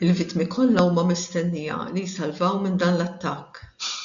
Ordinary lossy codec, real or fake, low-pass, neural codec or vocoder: MP3, 96 kbps; real; 7.2 kHz; none